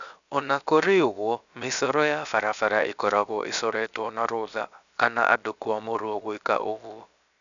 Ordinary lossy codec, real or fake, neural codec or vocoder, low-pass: none; fake; codec, 16 kHz, 0.7 kbps, FocalCodec; 7.2 kHz